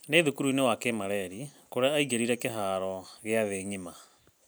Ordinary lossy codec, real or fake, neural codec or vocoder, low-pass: none; real; none; none